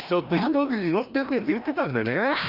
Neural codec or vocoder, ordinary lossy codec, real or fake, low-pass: codec, 16 kHz, 1 kbps, FreqCodec, larger model; none; fake; 5.4 kHz